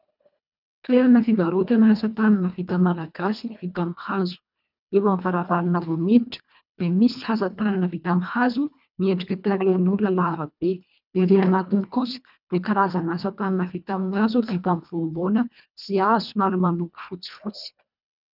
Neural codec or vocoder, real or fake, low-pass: codec, 24 kHz, 1.5 kbps, HILCodec; fake; 5.4 kHz